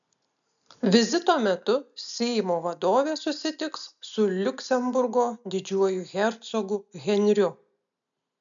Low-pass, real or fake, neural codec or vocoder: 7.2 kHz; real; none